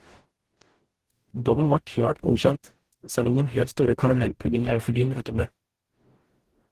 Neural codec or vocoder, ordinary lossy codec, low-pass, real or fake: codec, 44.1 kHz, 0.9 kbps, DAC; Opus, 16 kbps; 14.4 kHz; fake